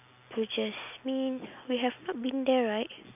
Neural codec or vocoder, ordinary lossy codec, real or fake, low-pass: none; none; real; 3.6 kHz